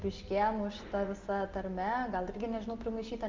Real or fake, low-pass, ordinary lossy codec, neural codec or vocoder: real; 7.2 kHz; Opus, 32 kbps; none